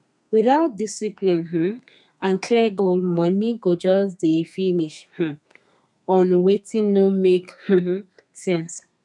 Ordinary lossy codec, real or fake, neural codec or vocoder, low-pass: none; fake; codec, 32 kHz, 1.9 kbps, SNAC; 10.8 kHz